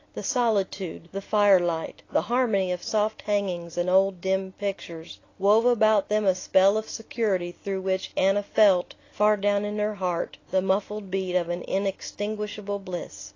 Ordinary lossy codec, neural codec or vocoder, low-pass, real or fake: AAC, 32 kbps; none; 7.2 kHz; real